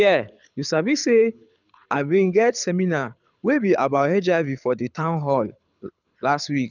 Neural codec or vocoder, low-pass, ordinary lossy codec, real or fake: codec, 24 kHz, 6 kbps, HILCodec; 7.2 kHz; none; fake